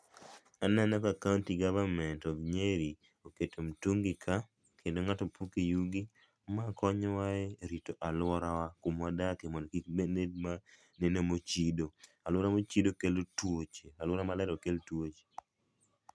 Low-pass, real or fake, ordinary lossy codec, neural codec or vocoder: none; real; none; none